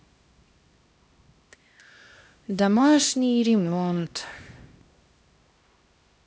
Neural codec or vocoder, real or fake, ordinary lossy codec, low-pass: codec, 16 kHz, 1 kbps, X-Codec, HuBERT features, trained on LibriSpeech; fake; none; none